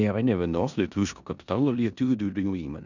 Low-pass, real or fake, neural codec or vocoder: 7.2 kHz; fake; codec, 16 kHz in and 24 kHz out, 0.9 kbps, LongCat-Audio-Codec, four codebook decoder